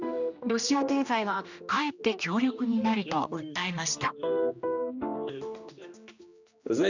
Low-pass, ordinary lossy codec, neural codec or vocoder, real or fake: 7.2 kHz; none; codec, 16 kHz, 1 kbps, X-Codec, HuBERT features, trained on general audio; fake